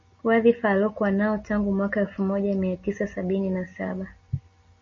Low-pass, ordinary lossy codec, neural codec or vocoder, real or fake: 7.2 kHz; MP3, 32 kbps; none; real